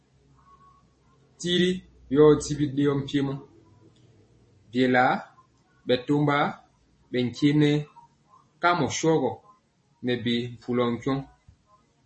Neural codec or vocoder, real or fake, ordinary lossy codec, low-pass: none; real; MP3, 32 kbps; 10.8 kHz